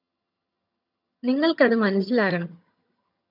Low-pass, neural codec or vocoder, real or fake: 5.4 kHz; vocoder, 22.05 kHz, 80 mel bands, HiFi-GAN; fake